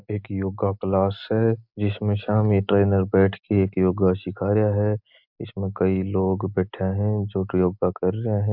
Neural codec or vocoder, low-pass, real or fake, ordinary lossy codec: none; 5.4 kHz; real; MP3, 48 kbps